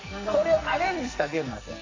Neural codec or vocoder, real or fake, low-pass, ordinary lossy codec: codec, 44.1 kHz, 2.6 kbps, SNAC; fake; 7.2 kHz; AAC, 32 kbps